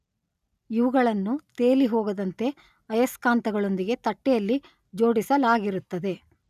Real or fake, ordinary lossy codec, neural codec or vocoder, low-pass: real; none; none; 14.4 kHz